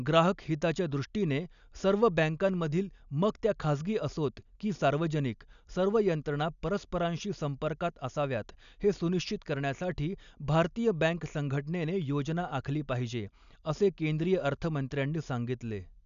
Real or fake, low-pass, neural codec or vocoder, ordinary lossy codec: real; 7.2 kHz; none; none